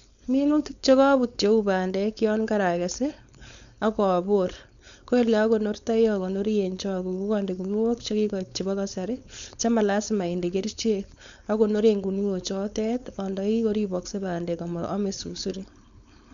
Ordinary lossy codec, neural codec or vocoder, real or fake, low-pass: none; codec, 16 kHz, 4.8 kbps, FACodec; fake; 7.2 kHz